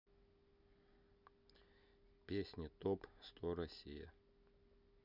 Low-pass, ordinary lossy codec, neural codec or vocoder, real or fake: 5.4 kHz; none; none; real